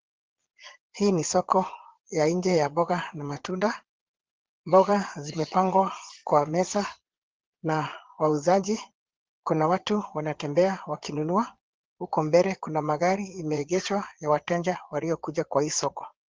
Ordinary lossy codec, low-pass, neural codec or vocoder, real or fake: Opus, 16 kbps; 7.2 kHz; vocoder, 22.05 kHz, 80 mel bands, Vocos; fake